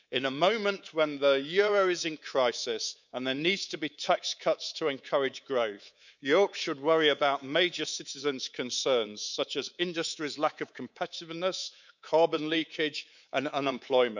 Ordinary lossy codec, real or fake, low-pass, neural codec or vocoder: none; fake; 7.2 kHz; codec, 24 kHz, 3.1 kbps, DualCodec